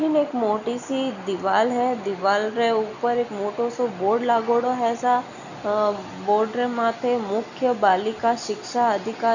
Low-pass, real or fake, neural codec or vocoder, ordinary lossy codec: 7.2 kHz; real; none; none